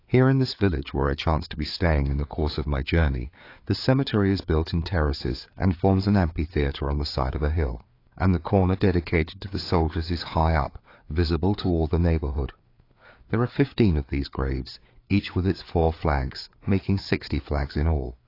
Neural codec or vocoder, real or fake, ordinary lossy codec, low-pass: codec, 16 kHz, 4 kbps, FreqCodec, larger model; fake; AAC, 32 kbps; 5.4 kHz